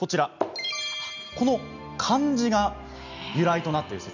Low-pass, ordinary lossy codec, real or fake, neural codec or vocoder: 7.2 kHz; none; real; none